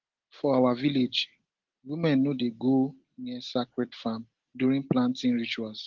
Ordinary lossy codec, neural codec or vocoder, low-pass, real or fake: Opus, 16 kbps; none; 7.2 kHz; real